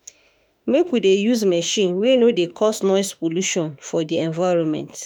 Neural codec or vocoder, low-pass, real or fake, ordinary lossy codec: autoencoder, 48 kHz, 32 numbers a frame, DAC-VAE, trained on Japanese speech; none; fake; none